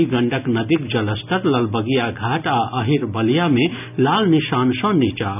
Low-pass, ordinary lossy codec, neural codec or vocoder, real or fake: 3.6 kHz; none; none; real